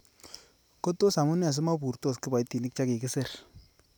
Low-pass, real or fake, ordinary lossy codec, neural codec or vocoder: none; real; none; none